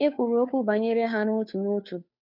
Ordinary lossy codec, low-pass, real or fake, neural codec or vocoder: none; 5.4 kHz; fake; codec, 16 kHz, 2 kbps, FunCodec, trained on Chinese and English, 25 frames a second